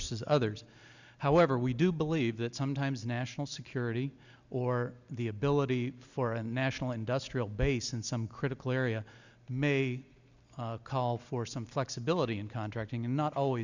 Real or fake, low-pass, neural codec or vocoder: real; 7.2 kHz; none